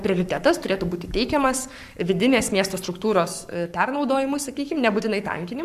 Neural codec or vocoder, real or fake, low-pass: codec, 44.1 kHz, 7.8 kbps, Pupu-Codec; fake; 14.4 kHz